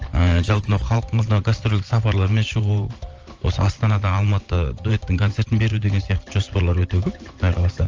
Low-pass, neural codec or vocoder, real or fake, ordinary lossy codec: 7.2 kHz; vocoder, 44.1 kHz, 128 mel bands every 512 samples, BigVGAN v2; fake; Opus, 24 kbps